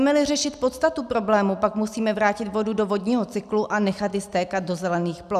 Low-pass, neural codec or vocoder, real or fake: 14.4 kHz; none; real